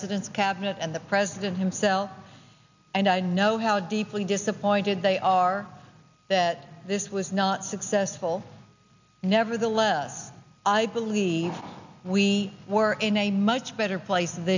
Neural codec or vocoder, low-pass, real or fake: none; 7.2 kHz; real